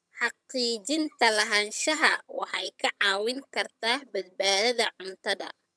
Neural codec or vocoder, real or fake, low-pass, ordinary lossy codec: vocoder, 22.05 kHz, 80 mel bands, HiFi-GAN; fake; none; none